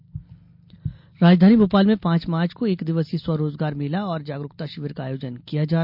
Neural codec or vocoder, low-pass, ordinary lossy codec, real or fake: none; 5.4 kHz; MP3, 48 kbps; real